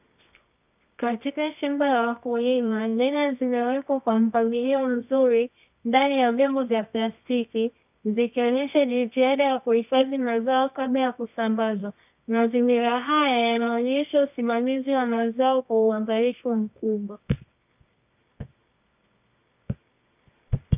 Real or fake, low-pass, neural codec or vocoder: fake; 3.6 kHz; codec, 24 kHz, 0.9 kbps, WavTokenizer, medium music audio release